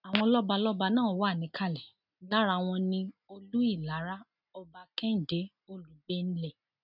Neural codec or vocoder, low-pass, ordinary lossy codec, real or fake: none; 5.4 kHz; none; real